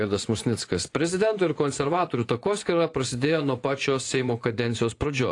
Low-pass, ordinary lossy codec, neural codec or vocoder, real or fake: 10.8 kHz; AAC, 48 kbps; vocoder, 24 kHz, 100 mel bands, Vocos; fake